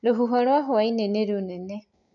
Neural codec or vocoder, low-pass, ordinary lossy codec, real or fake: none; 7.2 kHz; none; real